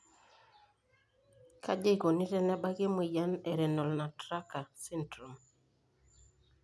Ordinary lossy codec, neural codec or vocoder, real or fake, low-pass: none; none; real; none